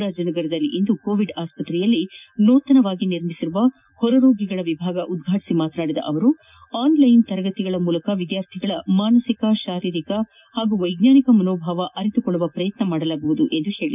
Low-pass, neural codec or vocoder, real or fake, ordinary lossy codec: 3.6 kHz; none; real; none